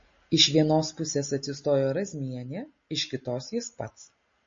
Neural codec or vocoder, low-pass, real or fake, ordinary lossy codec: none; 7.2 kHz; real; MP3, 32 kbps